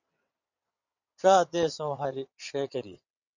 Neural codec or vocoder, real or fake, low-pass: vocoder, 22.05 kHz, 80 mel bands, WaveNeXt; fake; 7.2 kHz